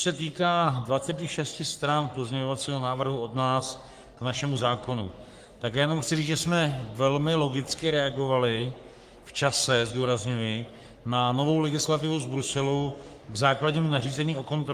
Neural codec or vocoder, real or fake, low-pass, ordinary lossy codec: codec, 44.1 kHz, 3.4 kbps, Pupu-Codec; fake; 14.4 kHz; Opus, 32 kbps